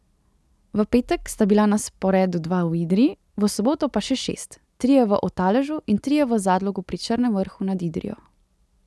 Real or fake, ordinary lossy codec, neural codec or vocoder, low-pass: real; none; none; none